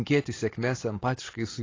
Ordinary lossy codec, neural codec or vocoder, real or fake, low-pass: AAC, 32 kbps; none; real; 7.2 kHz